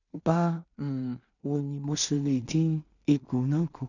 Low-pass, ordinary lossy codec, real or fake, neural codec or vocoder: 7.2 kHz; none; fake; codec, 16 kHz in and 24 kHz out, 0.4 kbps, LongCat-Audio-Codec, two codebook decoder